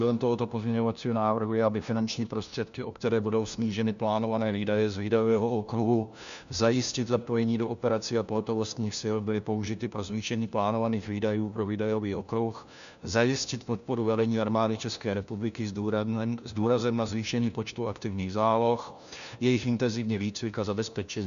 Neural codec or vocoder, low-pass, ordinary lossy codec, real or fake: codec, 16 kHz, 1 kbps, FunCodec, trained on LibriTTS, 50 frames a second; 7.2 kHz; MP3, 96 kbps; fake